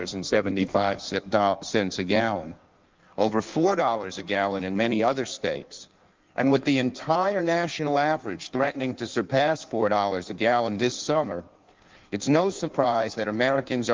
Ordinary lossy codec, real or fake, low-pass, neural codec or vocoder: Opus, 24 kbps; fake; 7.2 kHz; codec, 16 kHz in and 24 kHz out, 1.1 kbps, FireRedTTS-2 codec